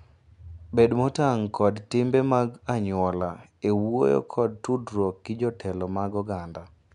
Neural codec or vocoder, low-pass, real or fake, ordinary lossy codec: none; 10.8 kHz; real; none